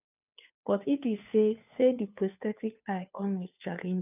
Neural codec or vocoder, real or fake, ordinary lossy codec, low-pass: codec, 16 kHz, 2 kbps, FunCodec, trained on Chinese and English, 25 frames a second; fake; none; 3.6 kHz